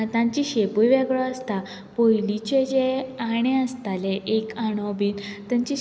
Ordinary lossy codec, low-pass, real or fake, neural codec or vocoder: none; none; real; none